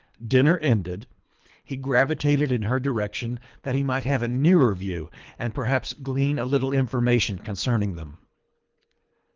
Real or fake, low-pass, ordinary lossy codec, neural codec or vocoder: fake; 7.2 kHz; Opus, 24 kbps; codec, 24 kHz, 3 kbps, HILCodec